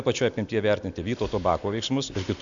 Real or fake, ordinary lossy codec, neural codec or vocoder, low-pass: real; MP3, 64 kbps; none; 7.2 kHz